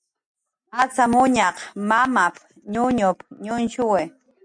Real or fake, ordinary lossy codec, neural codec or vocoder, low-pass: real; MP3, 96 kbps; none; 9.9 kHz